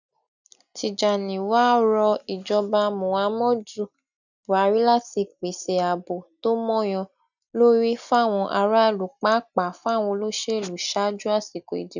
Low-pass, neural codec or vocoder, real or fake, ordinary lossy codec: 7.2 kHz; none; real; none